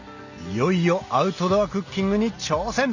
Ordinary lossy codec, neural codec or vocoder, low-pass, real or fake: none; none; 7.2 kHz; real